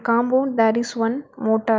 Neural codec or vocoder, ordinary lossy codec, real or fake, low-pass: none; none; real; none